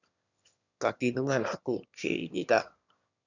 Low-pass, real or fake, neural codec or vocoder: 7.2 kHz; fake; autoencoder, 22.05 kHz, a latent of 192 numbers a frame, VITS, trained on one speaker